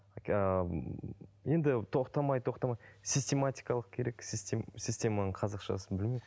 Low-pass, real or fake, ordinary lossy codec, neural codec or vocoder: none; real; none; none